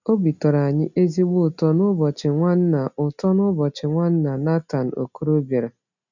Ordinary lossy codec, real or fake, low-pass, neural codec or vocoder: none; real; 7.2 kHz; none